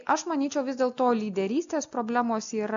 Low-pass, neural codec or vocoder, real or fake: 7.2 kHz; none; real